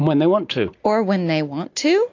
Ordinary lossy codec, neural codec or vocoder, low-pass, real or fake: AAC, 48 kbps; none; 7.2 kHz; real